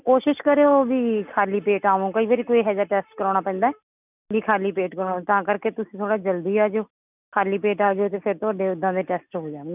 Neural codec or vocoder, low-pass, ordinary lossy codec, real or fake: none; 3.6 kHz; none; real